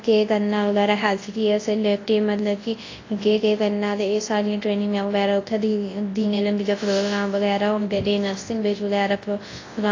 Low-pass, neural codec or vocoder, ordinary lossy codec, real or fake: 7.2 kHz; codec, 24 kHz, 0.9 kbps, WavTokenizer, large speech release; AAC, 32 kbps; fake